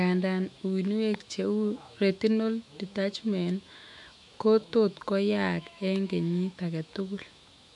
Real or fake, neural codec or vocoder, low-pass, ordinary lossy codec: fake; autoencoder, 48 kHz, 128 numbers a frame, DAC-VAE, trained on Japanese speech; 10.8 kHz; none